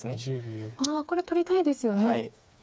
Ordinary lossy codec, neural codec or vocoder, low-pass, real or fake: none; codec, 16 kHz, 4 kbps, FreqCodec, smaller model; none; fake